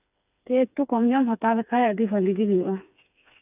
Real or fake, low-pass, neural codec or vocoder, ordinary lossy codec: fake; 3.6 kHz; codec, 16 kHz, 4 kbps, FreqCodec, smaller model; none